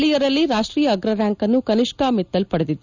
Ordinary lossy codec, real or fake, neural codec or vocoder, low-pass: none; real; none; 7.2 kHz